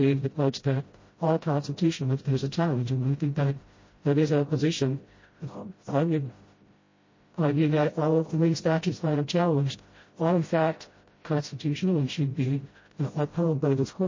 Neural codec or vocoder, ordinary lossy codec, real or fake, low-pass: codec, 16 kHz, 0.5 kbps, FreqCodec, smaller model; MP3, 32 kbps; fake; 7.2 kHz